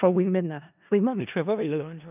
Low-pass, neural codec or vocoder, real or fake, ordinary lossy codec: 3.6 kHz; codec, 16 kHz in and 24 kHz out, 0.4 kbps, LongCat-Audio-Codec, four codebook decoder; fake; none